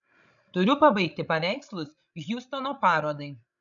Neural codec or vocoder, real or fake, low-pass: codec, 16 kHz, 16 kbps, FreqCodec, larger model; fake; 7.2 kHz